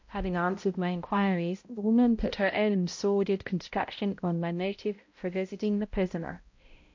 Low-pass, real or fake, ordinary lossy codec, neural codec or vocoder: 7.2 kHz; fake; MP3, 48 kbps; codec, 16 kHz, 0.5 kbps, X-Codec, HuBERT features, trained on balanced general audio